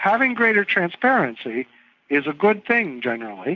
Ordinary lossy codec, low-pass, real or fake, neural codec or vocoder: AAC, 48 kbps; 7.2 kHz; real; none